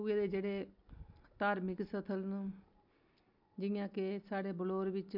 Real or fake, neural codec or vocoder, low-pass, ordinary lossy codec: real; none; 5.4 kHz; MP3, 48 kbps